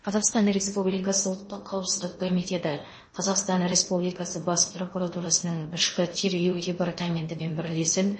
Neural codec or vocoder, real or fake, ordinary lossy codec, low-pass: codec, 16 kHz in and 24 kHz out, 0.8 kbps, FocalCodec, streaming, 65536 codes; fake; MP3, 32 kbps; 9.9 kHz